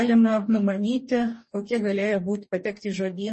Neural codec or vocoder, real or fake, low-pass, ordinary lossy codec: codec, 44.1 kHz, 2.6 kbps, DAC; fake; 10.8 kHz; MP3, 32 kbps